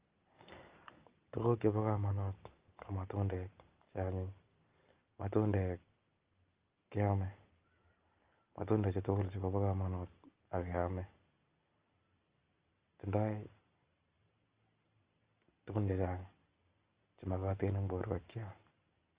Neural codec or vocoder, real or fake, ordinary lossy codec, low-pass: none; real; Opus, 24 kbps; 3.6 kHz